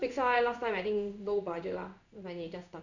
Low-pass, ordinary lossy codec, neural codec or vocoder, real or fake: 7.2 kHz; none; codec, 16 kHz in and 24 kHz out, 1 kbps, XY-Tokenizer; fake